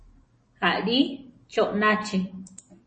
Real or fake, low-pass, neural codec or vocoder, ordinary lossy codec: real; 10.8 kHz; none; MP3, 32 kbps